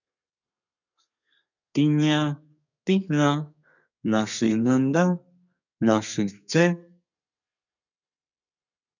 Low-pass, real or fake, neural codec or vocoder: 7.2 kHz; fake; codec, 32 kHz, 1.9 kbps, SNAC